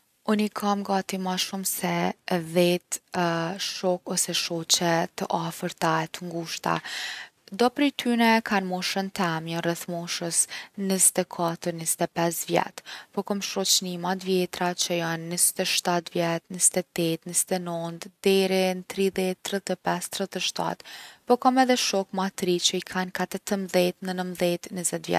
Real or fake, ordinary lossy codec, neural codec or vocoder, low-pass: real; none; none; 14.4 kHz